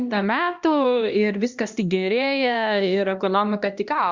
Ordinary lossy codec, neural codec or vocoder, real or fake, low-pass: Opus, 64 kbps; codec, 16 kHz, 1 kbps, X-Codec, HuBERT features, trained on LibriSpeech; fake; 7.2 kHz